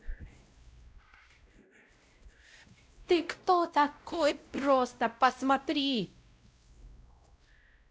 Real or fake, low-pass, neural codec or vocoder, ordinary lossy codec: fake; none; codec, 16 kHz, 0.5 kbps, X-Codec, WavLM features, trained on Multilingual LibriSpeech; none